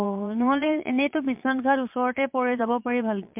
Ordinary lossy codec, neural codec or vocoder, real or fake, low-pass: MP3, 32 kbps; vocoder, 22.05 kHz, 80 mel bands, Vocos; fake; 3.6 kHz